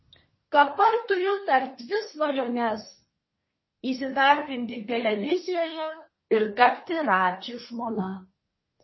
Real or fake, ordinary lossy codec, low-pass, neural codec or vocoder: fake; MP3, 24 kbps; 7.2 kHz; codec, 24 kHz, 1 kbps, SNAC